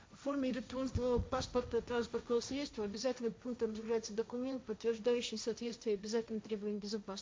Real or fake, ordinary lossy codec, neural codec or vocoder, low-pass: fake; none; codec, 16 kHz, 1.1 kbps, Voila-Tokenizer; none